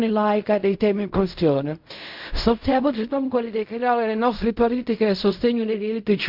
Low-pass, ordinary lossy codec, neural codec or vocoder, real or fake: 5.4 kHz; MP3, 48 kbps; codec, 16 kHz in and 24 kHz out, 0.4 kbps, LongCat-Audio-Codec, fine tuned four codebook decoder; fake